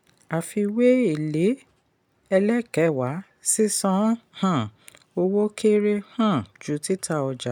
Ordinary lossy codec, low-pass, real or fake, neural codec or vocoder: none; 19.8 kHz; real; none